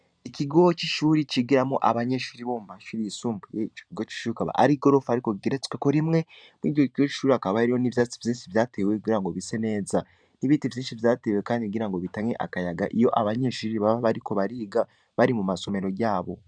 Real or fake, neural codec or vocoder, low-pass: fake; vocoder, 44.1 kHz, 128 mel bands every 512 samples, BigVGAN v2; 9.9 kHz